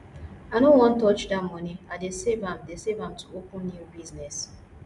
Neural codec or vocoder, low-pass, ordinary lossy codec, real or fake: none; 10.8 kHz; none; real